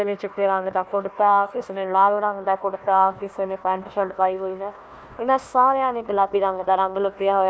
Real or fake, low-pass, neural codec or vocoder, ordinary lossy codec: fake; none; codec, 16 kHz, 1 kbps, FunCodec, trained on Chinese and English, 50 frames a second; none